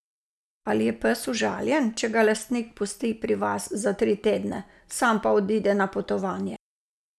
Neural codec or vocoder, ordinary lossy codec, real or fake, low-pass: none; none; real; none